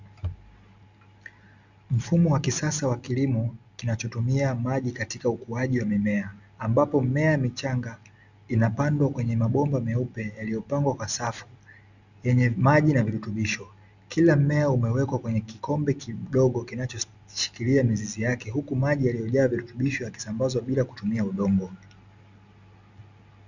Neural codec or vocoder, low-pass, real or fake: none; 7.2 kHz; real